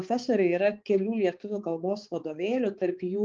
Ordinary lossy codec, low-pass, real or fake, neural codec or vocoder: Opus, 24 kbps; 7.2 kHz; fake; codec, 16 kHz, 4 kbps, X-Codec, HuBERT features, trained on balanced general audio